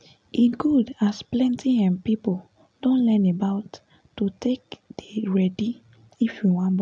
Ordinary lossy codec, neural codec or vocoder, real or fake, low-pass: none; none; real; 9.9 kHz